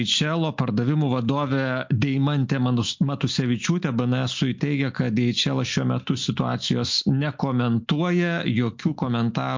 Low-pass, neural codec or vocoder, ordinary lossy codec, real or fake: 7.2 kHz; none; MP3, 48 kbps; real